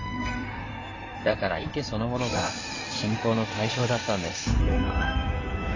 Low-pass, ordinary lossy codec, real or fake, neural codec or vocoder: 7.2 kHz; AAC, 32 kbps; fake; codec, 16 kHz in and 24 kHz out, 2.2 kbps, FireRedTTS-2 codec